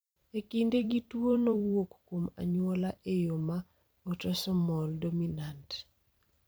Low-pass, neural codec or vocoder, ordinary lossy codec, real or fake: none; vocoder, 44.1 kHz, 128 mel bands every 256 samples, BigVGAN v2; none; fake